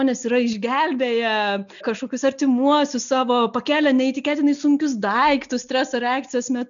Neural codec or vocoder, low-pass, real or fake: none; 7.2 kHz; real